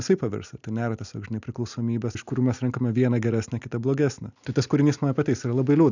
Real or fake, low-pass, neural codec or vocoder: real; 7.2 kHz; none